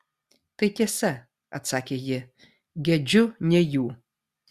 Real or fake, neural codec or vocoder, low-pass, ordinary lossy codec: real; none; 14.4 kHz; Opus, 64 kbps